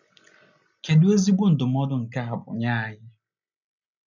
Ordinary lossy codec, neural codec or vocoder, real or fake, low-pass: none; none; real; 7.2 kHz